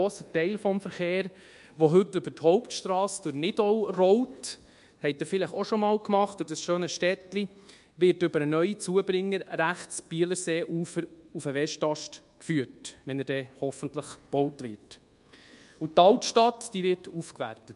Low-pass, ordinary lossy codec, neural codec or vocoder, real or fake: 10.8 kHz; MP3, 64 kbps; codec, 24 kHz, 1.2 kbps, DualCodec; fake